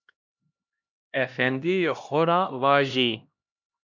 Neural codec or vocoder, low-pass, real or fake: codec, 16 kHz, 1 kbps, X-Codec, HuBERT features, trained on LibriSpeech; 7.2 kHz; fake